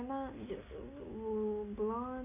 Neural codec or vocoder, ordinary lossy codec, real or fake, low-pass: none; none; real; 3.6 kHz